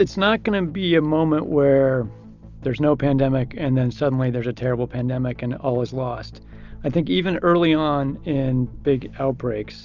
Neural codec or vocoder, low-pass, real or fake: none; 7.2 kHz; real